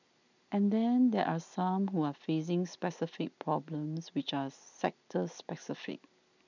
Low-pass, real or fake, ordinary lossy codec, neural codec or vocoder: 7.2 kHz; real; none; none